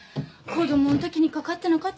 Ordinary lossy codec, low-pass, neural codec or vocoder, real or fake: none; none; none; real